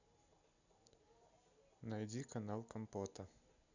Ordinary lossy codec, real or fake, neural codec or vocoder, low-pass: none; real; none; 7.2 kHz